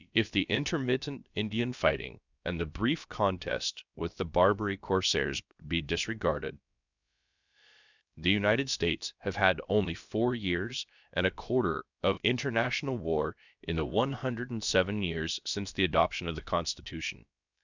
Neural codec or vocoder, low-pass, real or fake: codec, 16 kHz, about 1 kbps, DyCAST, with the encoder's durations; 7.2 kHz; fake